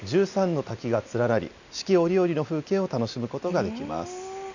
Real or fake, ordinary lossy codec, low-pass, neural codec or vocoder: real; none; 7.2 kHz; none